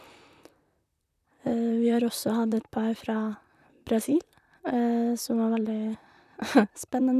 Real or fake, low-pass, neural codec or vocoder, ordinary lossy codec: fake; 14.4 kHz; vocoder, 44.1 kHz, 128 mel bands, Pupu-Vocoder; none